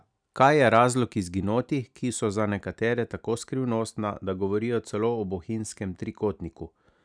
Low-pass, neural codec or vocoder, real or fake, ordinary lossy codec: 9.9 kHz; none; real; none